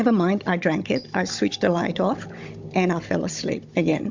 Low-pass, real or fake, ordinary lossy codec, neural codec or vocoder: 7.2 kHz; fake; MP3, 64 kbps; codec, 16 kHz, 16 kbps, FunCodec, trained on Chinese and English, 50 frames a second